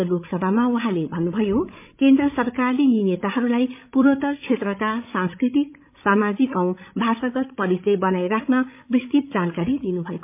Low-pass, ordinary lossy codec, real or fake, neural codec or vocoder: 3.6 kHz; MP3, 32 kbps; fake; codec, 16 kHz, 16 kbps, FreqCodec, larger model